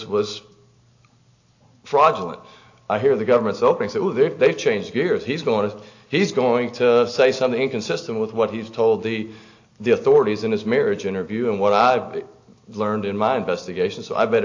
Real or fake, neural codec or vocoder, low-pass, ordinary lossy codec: real; none; 7.2 kHz; AAC, 48 kbps